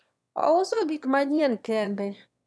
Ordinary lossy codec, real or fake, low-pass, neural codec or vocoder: none; fake; none; autoencoder, 22.05 kHz, a latent of 192 numbers a frame, VITS, trained on one speaker